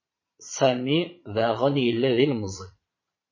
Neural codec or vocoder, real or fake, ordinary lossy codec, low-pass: vocoder, 22.05 kHz, 80 mel bands, Vocos; fake; MP3, 32 kbps; 7.2 kHz